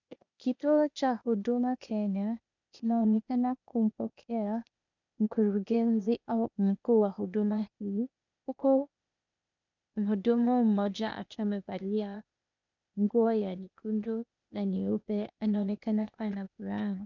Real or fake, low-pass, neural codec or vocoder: fake; 7.2 kHz; codec, 16 kHz, 0.8 kbps, ZipCodec